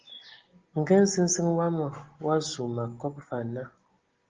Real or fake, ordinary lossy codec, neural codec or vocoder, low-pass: real; Opus, 16 kbps; none; 7.2 kHz